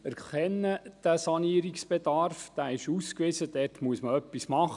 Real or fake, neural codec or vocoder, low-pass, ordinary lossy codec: real; none; 10.8 kHz; none